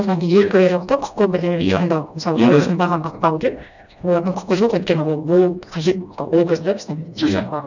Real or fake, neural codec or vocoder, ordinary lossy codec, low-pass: fake; codec, 16 kHz, 1 kbps, FreqCodec, smaller model; none; 7.2 kHz